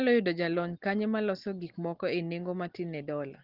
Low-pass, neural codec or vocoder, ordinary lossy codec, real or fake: 5.4 kHz; none; Opus, 16 kbps; real